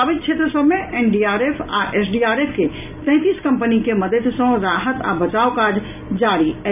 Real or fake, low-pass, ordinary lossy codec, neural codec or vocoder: real; 3.6 kHz; none; none